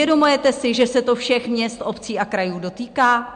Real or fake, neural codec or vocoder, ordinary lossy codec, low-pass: real; none; MP3, 64 kbps; 9.9 kHz